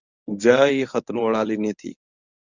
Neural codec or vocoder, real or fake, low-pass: codec, 24 kHz, 0.9 kbps, WavTokenizer, medium speech release version 1; fake; 7.2 kHz